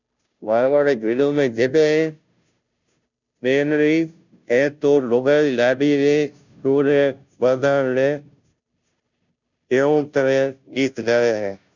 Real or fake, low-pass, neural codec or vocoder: fake; 7.2 kHz; codec, 16 kHz, 0.5 kbps, FunCodec, trained on Chinese and English, 25 frames a second